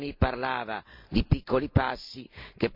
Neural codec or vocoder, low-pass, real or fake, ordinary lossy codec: none; 5.4 kHz; real; MP3, 48 kbps